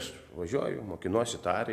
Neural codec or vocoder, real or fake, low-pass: none; real; 14.4 kHz